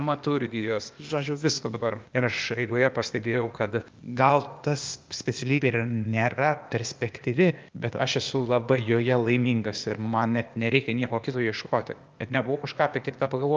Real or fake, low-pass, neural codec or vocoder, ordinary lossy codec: fake; 7.2 kHz; codec, 16 kHz, 0.8 kbps, ZipCodec; Opus, 24 kbps